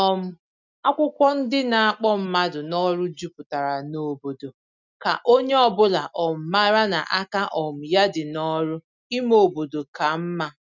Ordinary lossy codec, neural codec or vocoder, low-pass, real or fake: none; none; 7.2 kHz; real